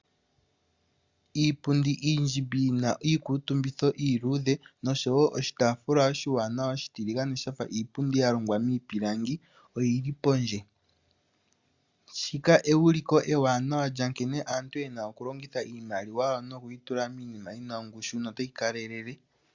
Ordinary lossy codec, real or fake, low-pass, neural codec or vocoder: Opus, 64 kbps; real; 7.2 kHz; none